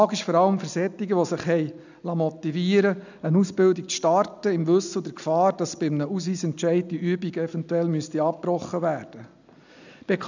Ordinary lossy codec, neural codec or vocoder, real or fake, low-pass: none; none; real; 7.2 kHz